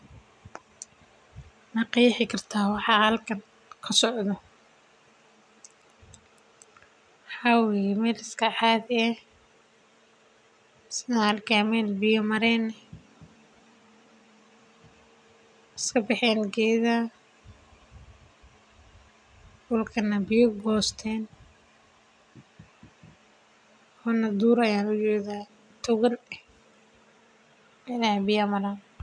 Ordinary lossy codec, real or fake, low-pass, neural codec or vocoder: none; real; 9.9 kHz; none